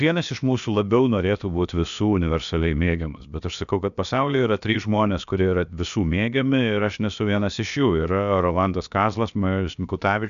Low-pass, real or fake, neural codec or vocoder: 7.2 kHz; fake; codec, 16 kHz, about 1 kbps, DyCAST, with the encoder's durations